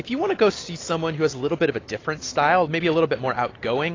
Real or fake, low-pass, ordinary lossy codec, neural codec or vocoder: real; 7.2 kHz; AAC, 32 kbps; none